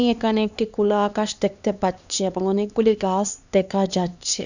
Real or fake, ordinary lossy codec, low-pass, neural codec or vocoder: fake; none; 7.2 kHz; codec, 16 kHz, 2 kbps, X-Codec, HuBERT features, trained on LibriSpeech